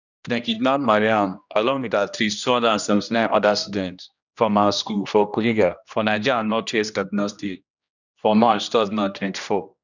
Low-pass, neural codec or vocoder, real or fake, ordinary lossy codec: 7.2 kHz; codec, 16 kHz, 1 kbps, X-Codec, HuBERT features, trained on general audio; fake; none